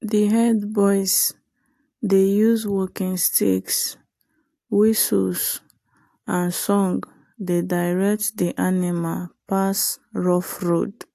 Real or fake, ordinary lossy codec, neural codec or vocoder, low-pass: real; AAC, 64 kbps; none; 14.4 kHz